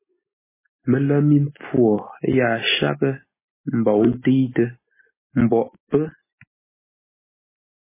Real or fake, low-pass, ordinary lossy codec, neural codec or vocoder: real; 3.6 kHz; MP3, 16 kbps; none